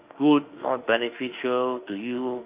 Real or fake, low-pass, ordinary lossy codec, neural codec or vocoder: fake; 3.6 kHz; Opus, 24 kbps; autoencoder, 48 kHz, 32 numbers a frame, DAC-VAE, trained on Japanese speech